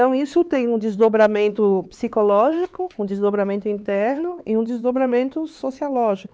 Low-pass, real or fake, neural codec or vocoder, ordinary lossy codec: none; fake; codec, 16 kHz, 4 kbps, X-Codec, HuBERT features, trained on LibriSpeech; none